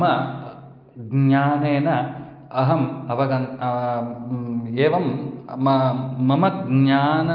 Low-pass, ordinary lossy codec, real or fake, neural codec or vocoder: 5.4 kHz; Opus, 24 kbps; real; none